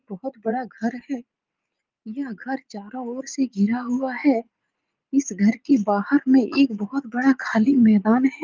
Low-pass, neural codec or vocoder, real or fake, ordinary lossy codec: 7.2 kHz; vocoder, 44.1 kHz, 80 mel bands, Vocos; fake; Opus, 24 kbps